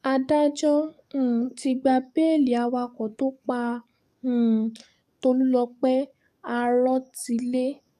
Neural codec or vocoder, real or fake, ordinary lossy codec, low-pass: codec, 44.1 kHz, 7.8 kbps, DAC; fake; none; 14.4 kHz